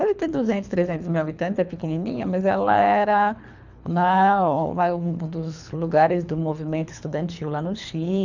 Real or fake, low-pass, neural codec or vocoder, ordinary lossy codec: fake; 7.2 kHz; codec, 24 kHz, 3 kbps, HILCodec; none